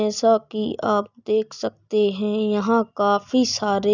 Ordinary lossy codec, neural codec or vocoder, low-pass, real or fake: none; none; 7.2 kHz; real